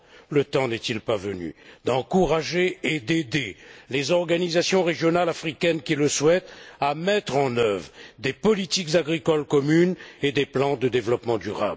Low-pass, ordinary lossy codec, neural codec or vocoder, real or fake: none; none; none; real